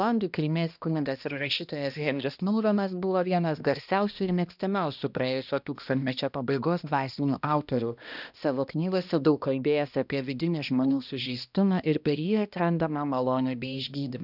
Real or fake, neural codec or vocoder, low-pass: fake; codec, 16 kHz, 1 kbps, X-Codec, HuBERT features, trained on balanced general audio; 5.4 kHz